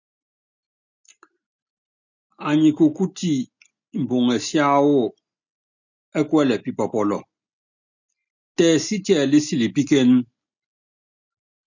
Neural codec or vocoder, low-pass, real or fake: none; 7.2 kHz; real